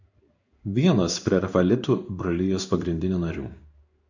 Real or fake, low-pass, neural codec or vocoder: fake; 7.2 kHz; codec, 16 kHz in and 24 kHz out, 1 kbps, XY-Tokenizer